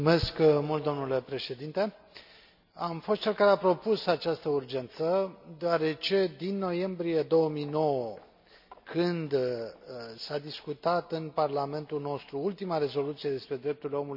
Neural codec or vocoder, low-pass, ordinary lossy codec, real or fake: none; 5.4 kHz; none; real